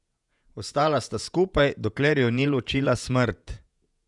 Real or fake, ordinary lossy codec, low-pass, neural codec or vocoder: fake; none; 10.8 kHz; vocoder, 48 kHz, 128 mel bands, Vocos